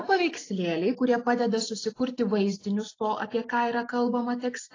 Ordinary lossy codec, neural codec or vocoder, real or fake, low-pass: AAC, 32 kbps; none; real; 7.2 kHz